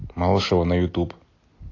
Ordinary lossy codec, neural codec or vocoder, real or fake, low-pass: AAC, 32 kbps; none; real; 7.2 kHz